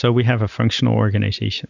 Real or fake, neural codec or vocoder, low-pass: real; none; 7.2 kHz